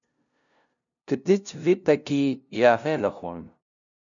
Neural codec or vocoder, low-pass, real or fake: codec, 16 kHz, 0.5 kbps, FunCodec, trained on LibriTTS, 25 frames a second; 7.2 kHz; fake